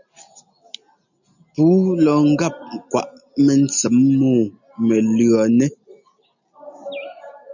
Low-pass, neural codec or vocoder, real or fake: 7.2 kHz; none; real